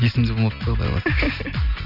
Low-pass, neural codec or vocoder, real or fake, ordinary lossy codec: 5.4 kHz; none; real; none